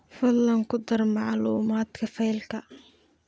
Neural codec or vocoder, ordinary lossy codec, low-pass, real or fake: none; none; none; real